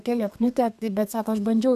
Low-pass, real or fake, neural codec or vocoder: 14.4 kHz; fake; codec, 32 kHz, 1.9 kbps, SNAC